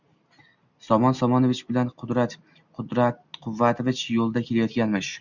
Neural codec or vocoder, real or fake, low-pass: none; real; 7.2 kHz